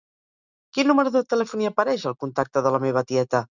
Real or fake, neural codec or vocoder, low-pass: real; none; 7.2 kHz